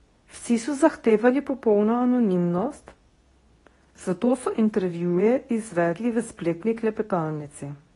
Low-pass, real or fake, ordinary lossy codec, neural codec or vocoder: 10.8 kHz; fake; AAC, 32 kbps; codec, 24 kHz, 0.9 kbps, WavTokenizer, medium speech release version 2